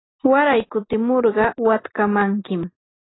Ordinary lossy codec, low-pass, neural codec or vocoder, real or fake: AAC, 16 kbps; 7.2 kHz; none; real